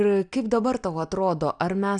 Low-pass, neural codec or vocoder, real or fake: 9.9 kHz; none; real